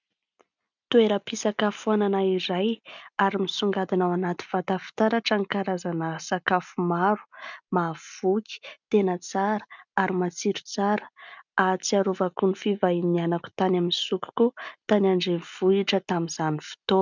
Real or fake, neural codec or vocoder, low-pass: real; none; 7.2 kHz